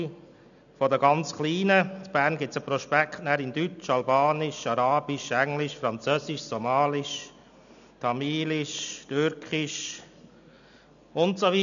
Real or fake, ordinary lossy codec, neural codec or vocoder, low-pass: real; none; none; 7.2 kHz